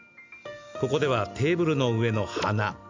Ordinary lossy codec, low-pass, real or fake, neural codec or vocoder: MP3, 64 kbps; 7.2 kHz; real; none